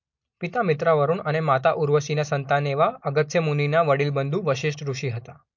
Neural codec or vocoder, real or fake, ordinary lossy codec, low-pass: none; real; MP3, 48 kbps; 7.2 kHz